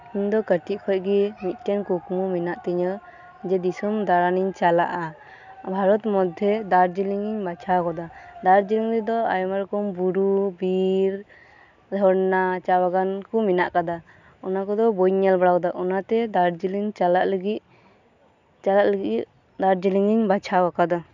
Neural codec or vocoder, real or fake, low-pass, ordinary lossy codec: none; real; 7.2 kHz; none